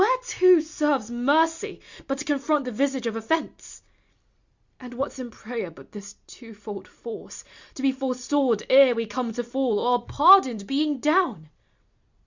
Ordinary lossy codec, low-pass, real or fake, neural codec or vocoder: Opus, 64 kbps; 7.2 kHz; real; none